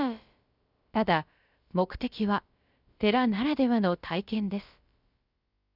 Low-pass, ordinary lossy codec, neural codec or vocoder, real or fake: 5.4 kHz; Opus, 64 kbps; codec, 16 kHz, about 1 kbps, DyCAST, with the encoder's durations; fake